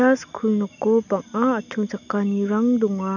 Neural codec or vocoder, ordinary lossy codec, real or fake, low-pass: none; none; real; 7.2 kHz